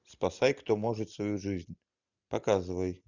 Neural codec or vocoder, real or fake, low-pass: none; real; 7.2 kHz